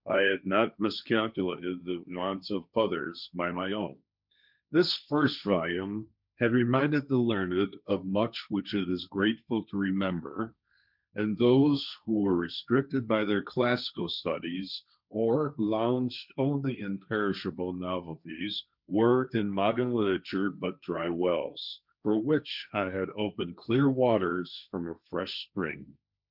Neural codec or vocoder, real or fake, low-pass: codec, 16 kHz, 1.1 kbps, Voila-Tokenizer; fake; 5.4 kHz